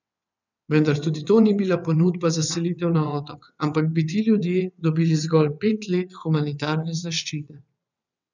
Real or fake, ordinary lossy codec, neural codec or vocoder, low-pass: fake; none; vocoder, 22.05 kHz, 80 mel bands, WaveNeXt; 7.2 kHz